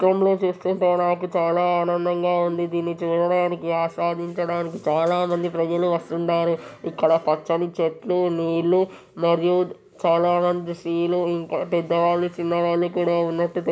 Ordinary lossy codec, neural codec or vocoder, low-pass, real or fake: none; none; none; real